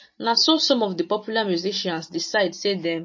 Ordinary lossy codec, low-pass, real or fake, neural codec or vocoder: MP3, 32 kbps; 7.2 kHz; real; none